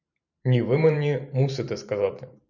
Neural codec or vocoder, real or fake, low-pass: vocoder, 44.1 kHz, 128 mel bands every 512 samples, BigVGAN v2; fake; 7.2 kHz